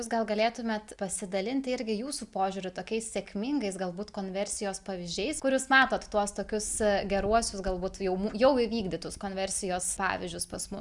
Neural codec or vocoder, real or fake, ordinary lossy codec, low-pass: none; real; Opus, 64 kbps; 10.8 kHz